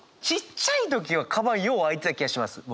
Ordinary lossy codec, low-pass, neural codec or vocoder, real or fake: none; none; none; real